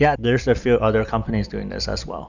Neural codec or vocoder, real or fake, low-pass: none; real; 7.2 kHz